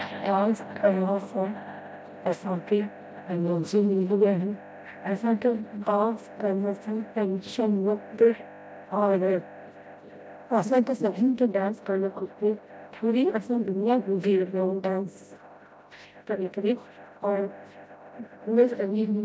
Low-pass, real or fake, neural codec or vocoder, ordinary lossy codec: none; fake; codec, 16 kHz, 0.5 kbps, FreqCodec, smaller model; none